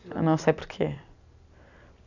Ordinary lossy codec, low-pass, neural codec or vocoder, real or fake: none; 7.2 kHz; none; real